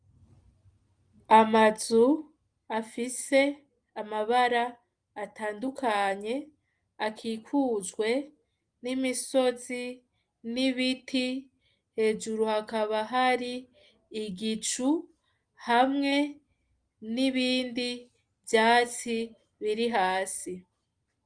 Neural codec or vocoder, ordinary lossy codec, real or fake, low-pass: none; Opus, 32 kbps; real; 9.9 kHz